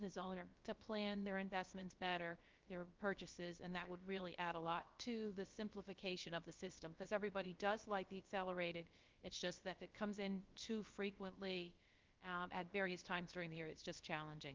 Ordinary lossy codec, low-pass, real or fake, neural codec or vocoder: Opus, 24 kbps; 7.2 kHz; fake; codec, 16 kHz, about 1 kbps, DyCAST, with the encoder's durations